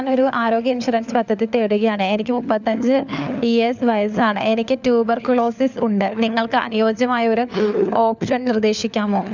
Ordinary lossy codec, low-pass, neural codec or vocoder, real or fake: none; 7.2 kHz; codec, 16 kHz, 4 kbps, FunCodec, trained on LibriTTS, 50 frames a second; fake